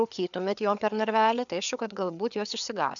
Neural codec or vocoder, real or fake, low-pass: codec, 16 kHz, 4 kbps, FreqCodec, larger model; fake; 7.2 kHz